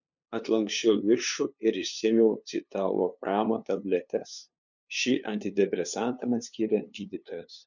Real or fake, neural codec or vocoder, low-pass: fake; codec, 16 kHz, 2 kbps, FunCodec, trained on LibriTTS, 25 frames a second; 7.2 kHz